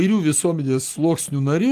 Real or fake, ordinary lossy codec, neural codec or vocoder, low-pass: real; Opus, 24 kbps; none; 14.4 kHz